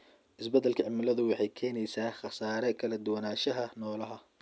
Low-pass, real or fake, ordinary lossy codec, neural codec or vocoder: none; real; none; none